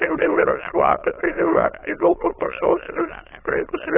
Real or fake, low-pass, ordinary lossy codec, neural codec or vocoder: fake; 3.6 kHz; AAC, 16 kbps; autoencoder, 22.05 kHz, a latent of 192 numbers a frame, VITS, trained on many speakers